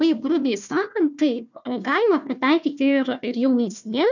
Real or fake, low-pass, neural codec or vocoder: fake; 7.2 kHz; codec, 16 kHz, 1 kbps, FunCodec, trained on Chinese and English, 50 frames a second